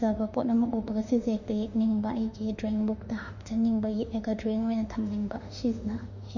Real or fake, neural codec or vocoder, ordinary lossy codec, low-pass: fake; autoencoder, 48 kHz, 32 numbers a frame, DAC-VAE, trained on Japanese speech; none; 7.2 kHz